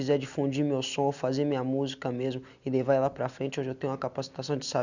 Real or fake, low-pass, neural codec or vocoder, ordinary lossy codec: real; 7.2 kHz; none; none